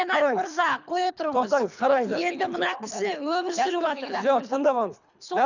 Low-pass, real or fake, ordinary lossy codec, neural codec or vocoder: 7.2 kHz; fake; none; codec, 24 kHz, 3 kbps, HILCodec